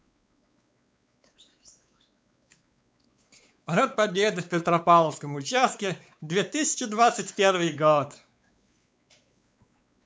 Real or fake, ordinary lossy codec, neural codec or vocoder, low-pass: fake; none; codec, 16 kHz, 4 kbps, X-Codec, WavLM features, trained on Multilingual LibriSpeech; none